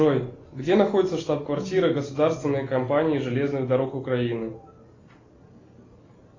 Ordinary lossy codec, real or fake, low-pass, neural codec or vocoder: AAC, 32 kbps; real; 7.2 kHz; none